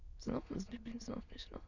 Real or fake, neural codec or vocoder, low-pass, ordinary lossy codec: fake; autoencoder, 22.05 kHz, a latent of 192 numbers a frame, VITS, trained on many speakers; 7.2 kHz; none